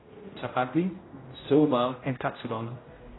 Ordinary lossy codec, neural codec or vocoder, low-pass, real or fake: AAC, 16 kbps; codec, 16 kHz, 0.5 kbps, X-Codec, HuBERT features, trained on general audio; 7.2 kHz; fake